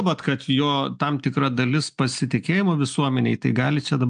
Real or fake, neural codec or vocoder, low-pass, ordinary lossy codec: real; none; 9.9 kHz; AAC, 64 kbps